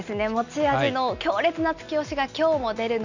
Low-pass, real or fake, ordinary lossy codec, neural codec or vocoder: 7.2 kHz; real; none; none